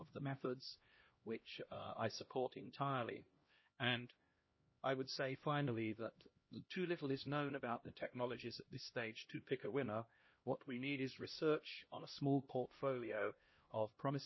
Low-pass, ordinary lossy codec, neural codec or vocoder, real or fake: 7.2 kHz; MP3, 24 kbps; codec, 16 kHz, 1 kbps, X-Codec, HuBERT features, trained on LibriSpeech; fake